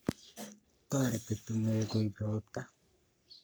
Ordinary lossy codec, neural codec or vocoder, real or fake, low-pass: none; codec, 44.1 kHz, 3.4 kbps, Pupu-Codec; fake; none